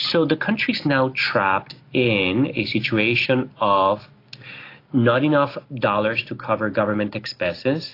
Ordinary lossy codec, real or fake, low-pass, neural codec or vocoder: AAC, 32 kbps; real; 5.4 kHz; none